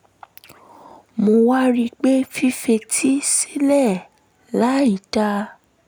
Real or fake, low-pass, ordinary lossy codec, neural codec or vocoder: real; none; none; none